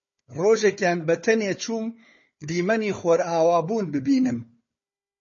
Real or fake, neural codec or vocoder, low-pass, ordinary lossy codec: fake; codec, 16 kHz, 4 kbps, FunCodec, trained on Chinese and English, 50 frames a second; 7.2 kHz; MP3, 32 kbps